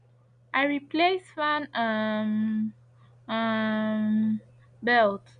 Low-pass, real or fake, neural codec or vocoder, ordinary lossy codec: 9.9 kHz; real; none; none